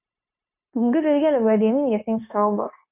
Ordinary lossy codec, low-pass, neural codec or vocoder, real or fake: none; 3.6 kHz; codec, 16 kHz, 0.9 kbps, LongCat-Audio-Codec; fake